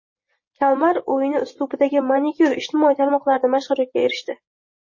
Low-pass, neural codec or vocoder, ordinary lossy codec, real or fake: 7.2 kHz; none; MP3, 32 kbps; real